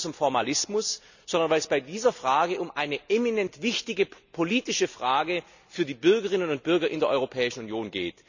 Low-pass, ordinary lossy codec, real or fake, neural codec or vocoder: 7.2 kHz; none; real; none